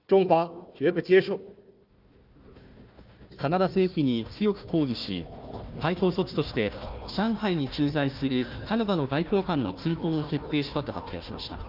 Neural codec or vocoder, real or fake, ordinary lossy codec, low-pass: codec, 16 kHz, 1 kbps, FunCodec, trained on Chinese and English, 50 frames a second; fake; Opus, 24 kbps; 5.4 kHz